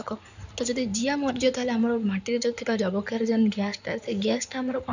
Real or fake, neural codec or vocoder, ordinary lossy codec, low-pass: fake; codec, 16 kHz in and 24 kHz out, 2.2 kbps, FireRedTTS-2 codec; none; 7.2 kHz